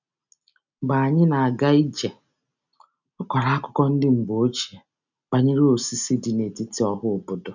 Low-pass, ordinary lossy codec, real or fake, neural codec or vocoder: 7.2 kHz; none; real; none